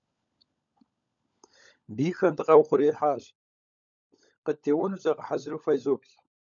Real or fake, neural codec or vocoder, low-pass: fake; codec, 16 kHz, 16 kbps, FunCodec, trained on LibriTTS, 50 frames a second; 7.2 kHz